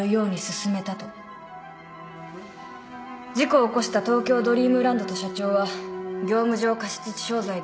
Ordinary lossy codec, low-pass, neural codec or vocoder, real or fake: none; none; none; real